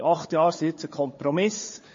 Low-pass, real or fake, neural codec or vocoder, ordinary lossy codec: 7.2 kHz; fake; codec, 16 kHz, 4 kbps, FunCodec, trained on Chinese and English, 50 frames a second; MP3, 32 kbps